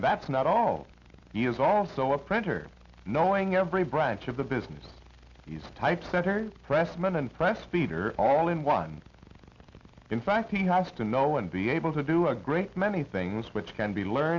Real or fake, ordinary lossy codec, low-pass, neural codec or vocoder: real; Opus, 64 kbps; 7.2 kHz; none